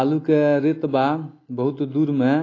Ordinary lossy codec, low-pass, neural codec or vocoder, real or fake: AAC, 32 kbps; 7.2 kHz; none; real